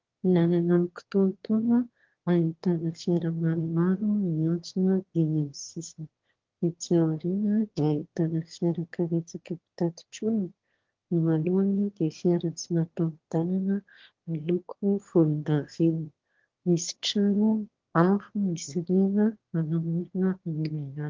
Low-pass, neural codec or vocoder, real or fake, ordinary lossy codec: 7.2 kHz; autoencoder, 22.05 kHz, a latent of 192 numbers a frame, VITS, trained on one speaker; fake; Opus, 16 kbps